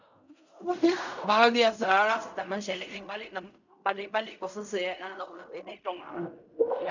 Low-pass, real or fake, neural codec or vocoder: 7.2 kHz; fake; codec, 16 kHz in and 24 kHz out, 0.4 kbps, LongCat-Audio-Codec, fine tuned four codebook decoder